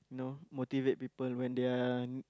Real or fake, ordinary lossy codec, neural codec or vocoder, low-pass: real; none; none; none